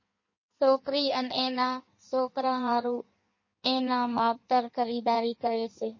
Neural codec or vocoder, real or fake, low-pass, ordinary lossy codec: codec, 16 kHz in and 24 kHz out, 1.1 kbps, FireRedTTS-2 codec; fake; 7.2 kHz; MP3, 32 kbps